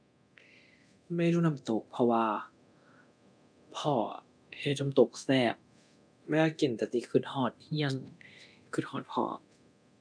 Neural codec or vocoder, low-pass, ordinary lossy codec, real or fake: codec, 24 kHz, 0.9 kbps, DualCodec; 9.9 kHz; none; fake